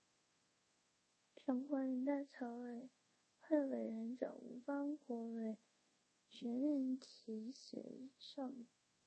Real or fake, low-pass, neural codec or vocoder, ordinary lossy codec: fake; 10.8 kHz; codec, 24 kHz, 0.5 kbps, DualCodec; MP3, 32 kbps